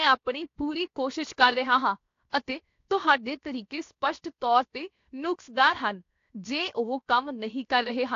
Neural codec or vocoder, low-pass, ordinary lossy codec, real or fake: codec, 16 kHz, 0.7 kbps, FocalCodec; 7.2 kHz; AAC, 48 kbps; fake